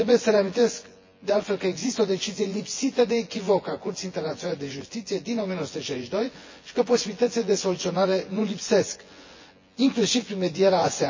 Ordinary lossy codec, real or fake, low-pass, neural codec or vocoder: MP3, 32 kbps; fake; 7.2 kHz; vocoder, 24 kHz, 100 mel bands, Vocos